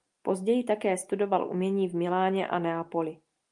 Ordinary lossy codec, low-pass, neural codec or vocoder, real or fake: Opus, 32 kbps; 10.8 kHz; none; real